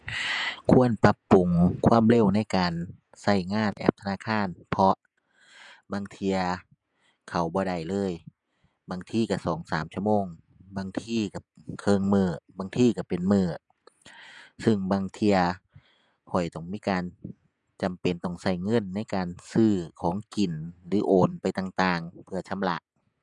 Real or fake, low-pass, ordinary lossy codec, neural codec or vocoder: fake; 10.8 kHz; none; vocoder, 48 kHz, 128 mel bands, Vocos